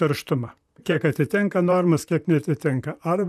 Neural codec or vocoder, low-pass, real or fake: vocoder, 44.1 kHz, 128 mel bands, Pupu-Vocoder; 14.4 kHz; fake